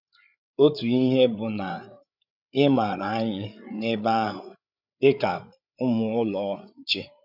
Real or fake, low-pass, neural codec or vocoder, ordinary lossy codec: fake; 5.4 kHz; codec, 16 kHz, 8 kbps, FreqCodec, larger model; none